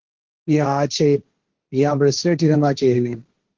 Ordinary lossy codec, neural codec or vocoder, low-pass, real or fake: Opus, 16 kbps; codec, 16 kHz, 1.1 kbps, Voila-Tokenizer; 7.2 kHz; fake